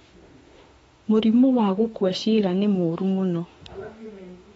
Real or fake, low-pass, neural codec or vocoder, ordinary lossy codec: fake; 19.8 kHz; autoencoder, 48 kHz, 32 numbers a frame, DAC-VAE, trained on Japanese speech; AAC, 24 kbps